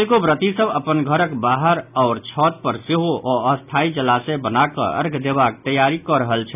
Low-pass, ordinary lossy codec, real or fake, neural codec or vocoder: 3.6 kHz; none; real; none